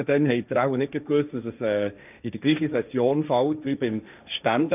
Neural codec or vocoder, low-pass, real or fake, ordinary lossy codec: codec, 16 kHz, 1.1 kbps, Voila-Tokenizer; 3.6 kHz; fake; none